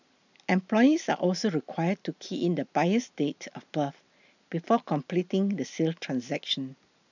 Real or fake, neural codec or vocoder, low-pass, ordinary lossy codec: real; none; 7.2 kHz; none